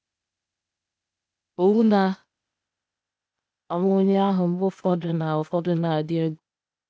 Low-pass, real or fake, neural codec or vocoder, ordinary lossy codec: none; fake; codec, 16 kHz, 0.8 kbps, ZipCodec; none